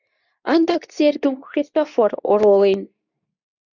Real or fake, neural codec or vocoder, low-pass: fake; codec, 24 kHz, 0.9 kbps, WavTokenizer, medium speech release version 2; 7.2 kHz